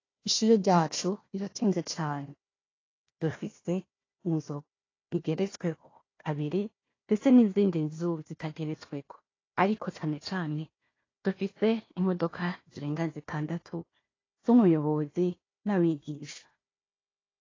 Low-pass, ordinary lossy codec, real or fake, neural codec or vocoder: 7.2 kHz; AAC, 32 kbps; fake; codec, 16 kHz, 1 kbps, FunCodec, trained on Chinese and English, 50 frames a second